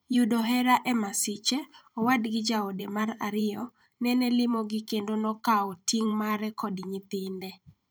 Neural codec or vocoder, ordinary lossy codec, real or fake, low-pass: vocoder, 44.1 kHz, 128 mel bands every 512 samples, BigVGAN v2; none; fake; none